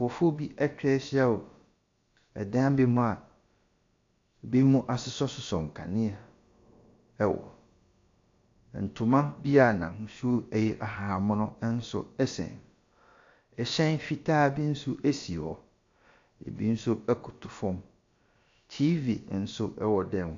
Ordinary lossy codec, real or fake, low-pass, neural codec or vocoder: AAC, 48 kbps; fake; 7.2 kHz; codec, 16 kHz, about 1 kbps, DyCAST, with the encoder's durations